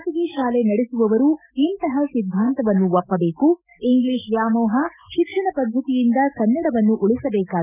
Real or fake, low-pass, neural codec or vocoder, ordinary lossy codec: fake; 3.6 kHz; autoencoder, 48 kHz, 128 numbers a frame, DAC-VAE, trained on Japanese speech; none